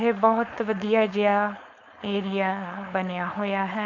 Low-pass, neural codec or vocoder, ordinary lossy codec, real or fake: 7.2 kHz; codec, 16 kHz, 4.8 kbps, FACodec; none; fake